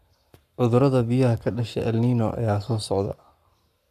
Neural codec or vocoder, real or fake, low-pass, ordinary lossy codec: codec, 44.1 kHz, 7.8 kbps, Pupu-Codec; fake; 14.4 kHz; none